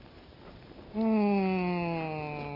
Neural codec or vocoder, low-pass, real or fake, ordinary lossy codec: none; 5.4 kHz; real; AAC, 24 kbps